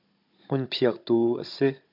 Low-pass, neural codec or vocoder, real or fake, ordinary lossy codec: 5.4 kHz; codec, 16 kHz, 16 kbps, FunCodec, trained on Chinese and English, 50 frames a second; fake; MP3, 48 kbps